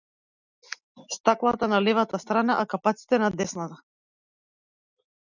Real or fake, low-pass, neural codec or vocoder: real; 7.2 kHz; none